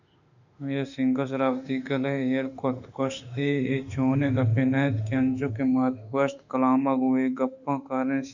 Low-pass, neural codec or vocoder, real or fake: 7.2 kHz; autoencoder, 48 kHz, 32 numbers a frame, DAC-VAE, trained on Japanese speech; fake